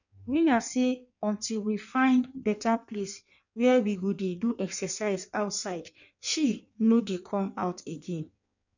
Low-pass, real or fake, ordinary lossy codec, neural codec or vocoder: 7.2 kHz; fake; none; codec, 16 kHz in and 24 kHz out, 1.1 kbps, FireRedTTS-2 codec